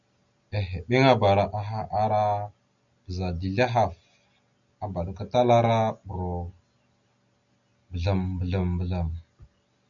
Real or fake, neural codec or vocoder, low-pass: real; none; 7.2 kHz